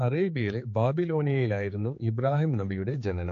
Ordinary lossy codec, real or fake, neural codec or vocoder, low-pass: AAC, 48 kbps; fake; codec, 16 kHz, 4 kbps, X-Codec, HuBERT features, trained on general audio; 7.2 kHz